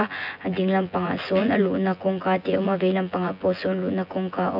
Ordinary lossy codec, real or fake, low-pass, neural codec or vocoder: AAC, 48 kbps; fake; 5.4 kHz; vocoder, 24 kHz, 100 mel bands, Vocos